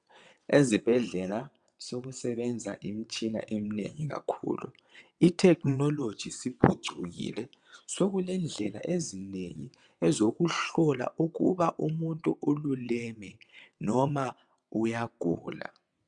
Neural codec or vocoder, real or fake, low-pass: vocoder, 22.05 kHz, 80 mel bands, WaveNeXt; fake; 9.9 kHz